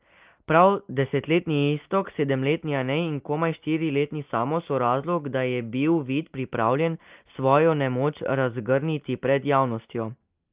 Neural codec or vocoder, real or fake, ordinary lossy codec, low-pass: none; real; Opus, 24 kbps; 3.6 kHz